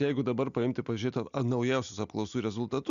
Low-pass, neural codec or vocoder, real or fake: 7.2 kHz; none; real